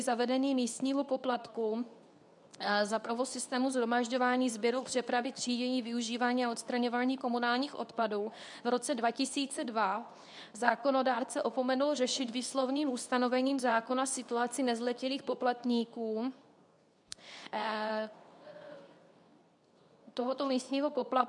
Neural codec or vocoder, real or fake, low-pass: codec, 24 kHz, 0.9 kbps, WavTokenizer, medium speech release version 2; fake; 10.8 kHz